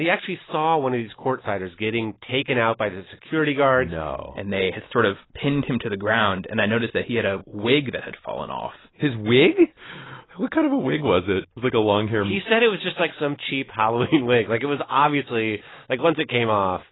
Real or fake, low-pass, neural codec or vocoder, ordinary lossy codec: real; 7.2 kHz; none; AAC, 16 kbps